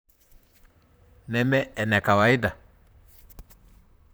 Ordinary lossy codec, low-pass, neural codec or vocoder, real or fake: none; none; vocoder, 44.1 kHz, 128 mel bands, Pupu-Vocoder; fake